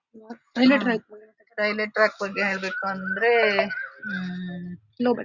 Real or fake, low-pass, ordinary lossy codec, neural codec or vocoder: real; 7.2 kHz; Opus, 64 kbps; none